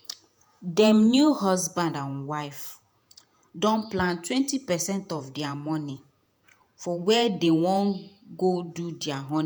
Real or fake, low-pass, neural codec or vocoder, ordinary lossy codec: fake; none; vocoder, 48 kHz, 128 mel bands, Vocos; none